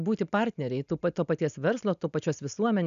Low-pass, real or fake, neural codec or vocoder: 7.2 kHz; real; none